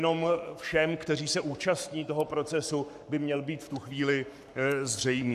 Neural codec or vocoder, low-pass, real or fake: codec, 44.1 kHz, 7.8 kbps, Pupu-Codec; 14.4 kHz; fake